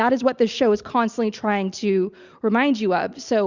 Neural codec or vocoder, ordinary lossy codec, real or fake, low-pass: none; Opus, 64 kbps; real; 7.2 kHz